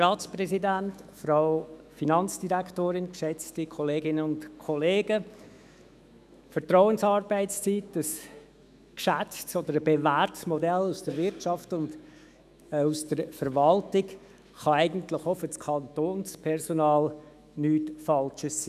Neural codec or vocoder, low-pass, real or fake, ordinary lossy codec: autoencoder, 48 kHz, 128 numbers a frame, DAC-VAE, trained on Japanese speech; 14.4 kHz; fake; none